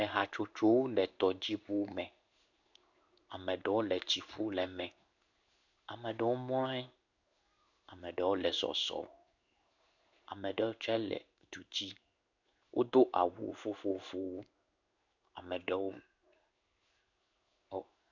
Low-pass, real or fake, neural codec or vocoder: 7.2 kHz; fake; codec, 16 kHz in and 24 kHz out, 1 kbps, XY-Tokenizer